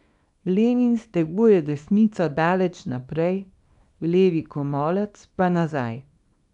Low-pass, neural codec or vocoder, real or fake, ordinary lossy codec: 10.8 kHz; codec, 24 kHz, 0.9 kbps, WavTokenizer, small release; fake; none